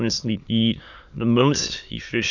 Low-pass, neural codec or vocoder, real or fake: 7.2 kHz; autoencoder, 22.05 kHz, a latent of 192 numbers a frame, VITS, trained on many speakers; fake